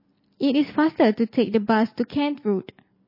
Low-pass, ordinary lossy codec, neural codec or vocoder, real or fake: 5.4 kHz; MP3, 24 kbps; none; real